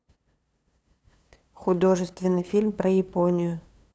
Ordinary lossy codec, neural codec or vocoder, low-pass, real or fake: none; codec, 16 kHz, 2 kbps, FunCodec, trained on LibriTTS, 25 frames a second; none; fake